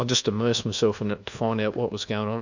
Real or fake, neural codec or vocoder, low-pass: fake; codec, 24 kHz, 1.2 kbps, DualCodec; 7.2 kHz